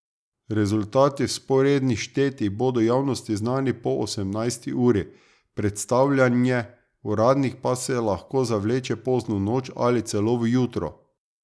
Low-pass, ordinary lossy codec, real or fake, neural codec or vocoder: none; none; real; none